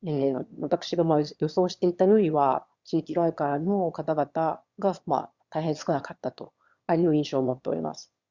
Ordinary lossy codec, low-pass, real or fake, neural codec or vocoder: Opus, 64 kbps; 7.2 kHz; fake; autoencoder, 22.05 kHz, a latent of 192 numbers a frame, VITS, trained on one speaker